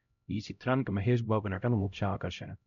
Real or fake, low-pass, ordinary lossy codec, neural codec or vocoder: fake; 7.2 kHz; MP3, 96 kbps; codec, 16 kHz, 0.5 kbps, X-Codec, HuBERT features, trained on LibriSpeech